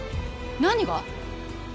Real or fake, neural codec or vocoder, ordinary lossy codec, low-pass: real; none; none; none